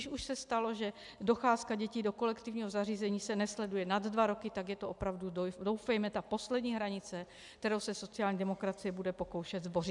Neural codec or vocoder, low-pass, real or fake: none; 10.8 kHz; real